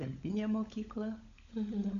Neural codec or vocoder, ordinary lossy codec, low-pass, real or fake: codec, 16 kHz, 16 kbps, FunCodec, trained on LibriTTS, 50 frames a second; AAC, 48 kbps; 7.2 kHz; fake